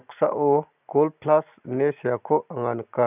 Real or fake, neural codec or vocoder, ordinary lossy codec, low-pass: real; none; none; 3.6 kHz